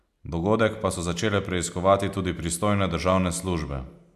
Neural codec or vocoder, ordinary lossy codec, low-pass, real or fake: none; none; 14.4 kHz; real